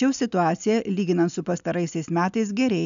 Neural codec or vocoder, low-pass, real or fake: none; 7.2 kHz; real